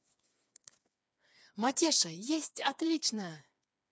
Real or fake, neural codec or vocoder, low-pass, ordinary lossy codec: fake; codec, 16 kHz, 4 kbps, FreqCodec, smaller model; none; none